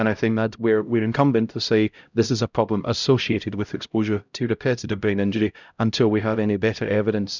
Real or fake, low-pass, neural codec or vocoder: fake; 7.2 kHz; codec, 16 kHz, 0.5 kbps, X-Codec, HuBERT features, trained on LibriSpeech